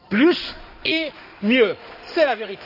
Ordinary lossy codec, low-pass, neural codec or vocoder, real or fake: none; 5.4 kHz; codec, 16 kHz in and 24 kHz out, 2.2 kbps, FireRedTTS-2 codec; fake